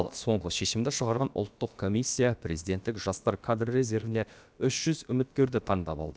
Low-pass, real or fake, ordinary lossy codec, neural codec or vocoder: none; fake; none; codec, 16 kHz, about 1 kbps, DyCAST, with the encoder's durations